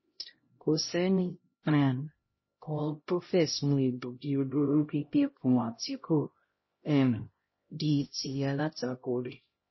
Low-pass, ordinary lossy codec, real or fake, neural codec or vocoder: 7.2 kHz; MP3, 24 kbps; fake; codec, 16 kHz, 0.5 kbps, X-Codec, HuBERT features, trained on LibriSpeech